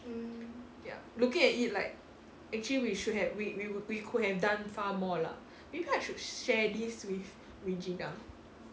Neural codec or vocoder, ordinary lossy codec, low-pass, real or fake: none; none; none; real